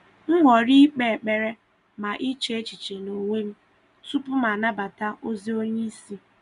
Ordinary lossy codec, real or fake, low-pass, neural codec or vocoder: none; real; 10.8 kHz; none